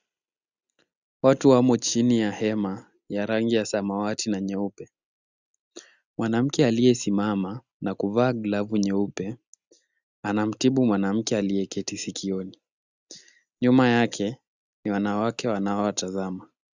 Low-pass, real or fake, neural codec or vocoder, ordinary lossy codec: 7.2 kHz; real; none; Opus, 64 kbps